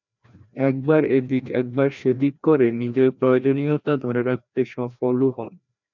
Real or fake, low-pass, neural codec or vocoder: fake; 7.2 kHz; codec, 16 kHz, 1 kbps, FreqCodec, larger model